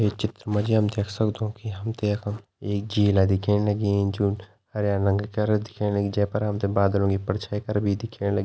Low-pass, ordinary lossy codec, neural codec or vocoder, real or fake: none; none; none; real